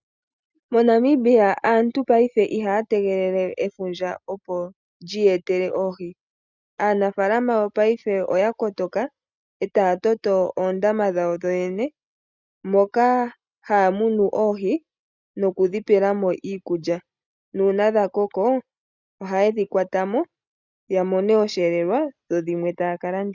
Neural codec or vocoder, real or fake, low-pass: none; real; 7.2 kHz